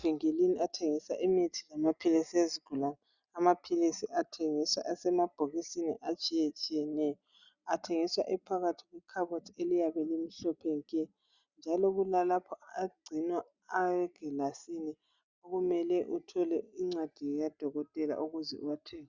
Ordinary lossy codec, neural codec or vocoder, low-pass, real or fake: Opus, 64 kbps; none; 7.2 kHz; real